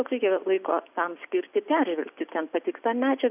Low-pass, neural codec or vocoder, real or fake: 3.6 kHz; none; real